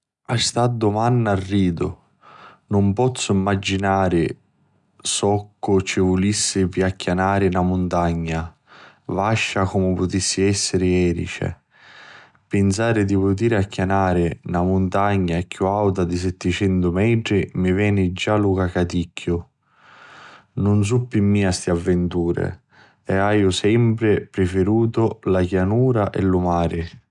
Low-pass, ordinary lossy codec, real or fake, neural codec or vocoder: 10.8 kHz; none; real; none